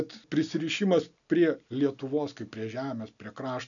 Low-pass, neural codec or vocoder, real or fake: 7.2 kHz; none; real